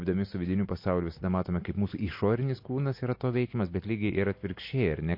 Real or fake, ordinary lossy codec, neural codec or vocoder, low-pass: real; MP3, 32 kbps; none; 5.4 kHz